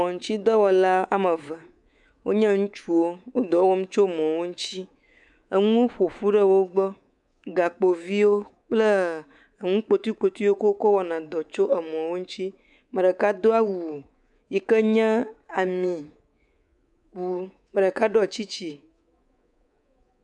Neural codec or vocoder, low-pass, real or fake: codec, 24 kHz, 3.1 kbps, DualCodec; 10.8 kHz; fake